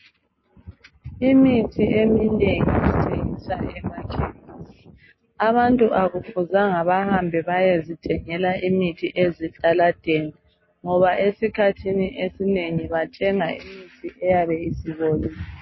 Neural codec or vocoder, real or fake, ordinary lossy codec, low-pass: none; real; MP3, 24 kbps; 7.2 kHz